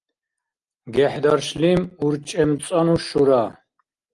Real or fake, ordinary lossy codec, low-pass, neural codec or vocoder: real; Opus, 24 kbps; 10.8 kHz; none